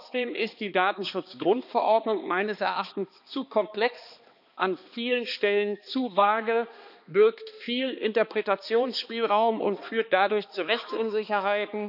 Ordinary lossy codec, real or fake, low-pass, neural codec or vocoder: none; fake; 5.4 kHz; codec, 16 kHz, 2 kbps, X-Codec, HuBERT features, trained on balanced general audio